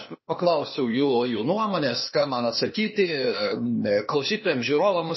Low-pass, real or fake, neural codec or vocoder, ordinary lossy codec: 7.2 kHz; fake; codec, 16 kHz, 0.8 kbps, ZipCodec; MP3, 24 kbps